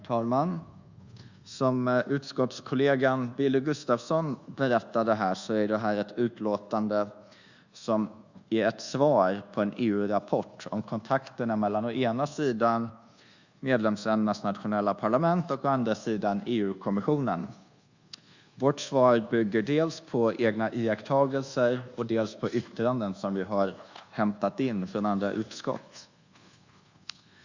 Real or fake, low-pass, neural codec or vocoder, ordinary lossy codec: fake; 7.2 kHz; codec, 24 kHz, 1.2 kbps, DualCodec; Opus, 64 kbps